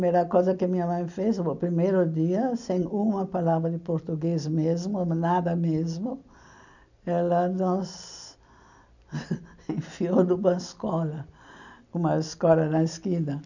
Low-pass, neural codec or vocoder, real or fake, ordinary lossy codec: 7.2 kHz; none; real; none